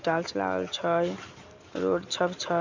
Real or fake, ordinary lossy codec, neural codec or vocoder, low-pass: real; MP3, 64 kbps; none; 7.2 kHz